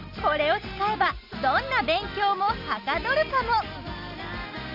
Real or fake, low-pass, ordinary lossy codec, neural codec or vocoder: real; 5.4 kHz; Opus, 64 kbps; none